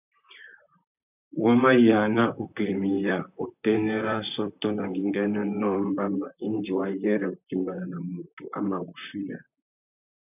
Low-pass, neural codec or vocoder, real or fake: 3.6 kHz; vocoder, 44.1 kHz, 128 mel bands, Pupu-Vocoder; fake